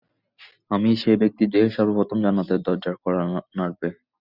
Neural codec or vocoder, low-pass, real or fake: none; 5.4 kHz; real